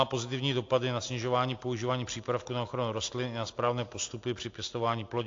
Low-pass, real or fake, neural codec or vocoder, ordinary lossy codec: 7.2 kHz; real; none; AAC, 48 kbps